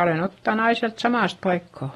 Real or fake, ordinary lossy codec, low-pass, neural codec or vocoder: real; AAC, 32 kbps; 19.8 kHz; none